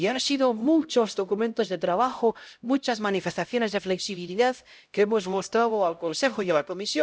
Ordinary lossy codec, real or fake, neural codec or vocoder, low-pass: none; fake; codec, 16 kHz, 0.5 kbps, X-Codec, HuBERT features, trained on LibriSpeech; none